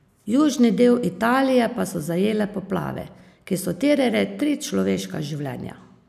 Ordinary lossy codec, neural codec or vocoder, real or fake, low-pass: none; none; real; 14.4 kHz